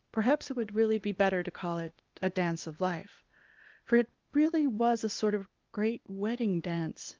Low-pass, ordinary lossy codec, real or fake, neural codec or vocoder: 7.2 kHz; Opus, 24 kbps; fake; codec, 16 kHz, 0.8 kbps, ZipCodec